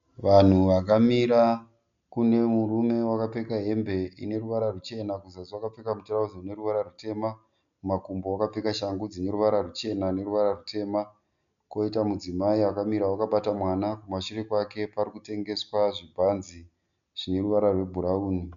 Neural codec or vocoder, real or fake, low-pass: none; real; 7.2 kHz